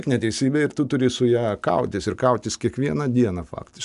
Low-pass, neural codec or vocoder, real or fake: 10.8 kHz; none; real